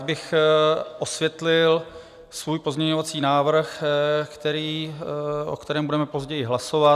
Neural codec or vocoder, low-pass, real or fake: none; 14.4 kHz; real